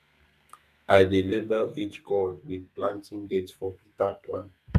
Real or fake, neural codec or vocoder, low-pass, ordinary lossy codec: fake; codec, 32 kHz, 1.9 kbps, SNAC; 14.4 kHz; none